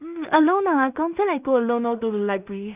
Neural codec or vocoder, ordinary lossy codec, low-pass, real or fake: codec, 16 kHz in and 24 kHz out, 0.4 kbps, LongCat-Audio-Codec, two codebook decoder; none; 3.6 kHz; fake